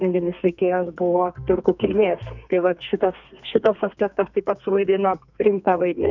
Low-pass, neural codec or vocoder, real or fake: 7.2 kHz; codec, 32 kHz, 1.9 kbps, SNAC; fake